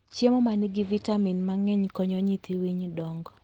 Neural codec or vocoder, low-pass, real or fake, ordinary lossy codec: none; 19.8 kHz; real; Opus, 16 kbps